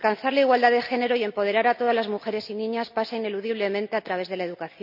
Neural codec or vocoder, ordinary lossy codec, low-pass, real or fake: none; none; 5.4 kHz; real